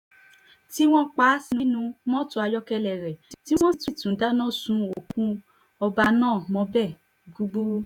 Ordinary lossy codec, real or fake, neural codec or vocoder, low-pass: none; fake; vocoder, 48 kHz, 128 mel bands, Vocos; 19.8 kHz